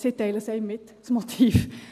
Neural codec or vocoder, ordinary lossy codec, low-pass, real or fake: none; MP3, 96 kbps; 14.4 kHz; real